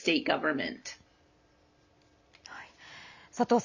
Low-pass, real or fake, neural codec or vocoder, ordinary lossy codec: 7.2 kHz; real; none; none